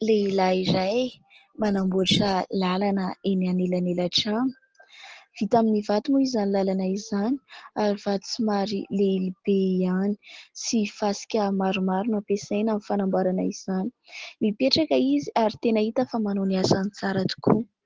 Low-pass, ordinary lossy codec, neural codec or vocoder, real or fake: 7.2 kHz; Opus, 16 kbps; none; real